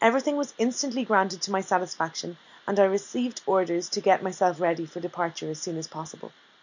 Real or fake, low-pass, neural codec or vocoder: real; 7.2 kHz; none